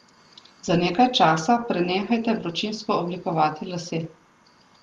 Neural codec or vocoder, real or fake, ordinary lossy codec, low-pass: none; real; Opus, 24 kbps; 19.8 kHz